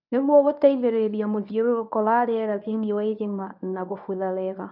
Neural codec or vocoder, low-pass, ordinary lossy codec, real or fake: codec, 24 kHz, 0.9 kbps, WavTokenizer, medium speech release version 1; 5.4 kHz; none; fake